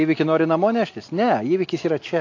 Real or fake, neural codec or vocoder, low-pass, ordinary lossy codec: real; none; 7.2 kHz; AAC, 48 kbps